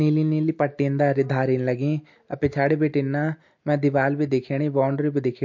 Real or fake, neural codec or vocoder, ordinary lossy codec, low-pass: real; none; MP3, 48 kbps; 7.2 kHz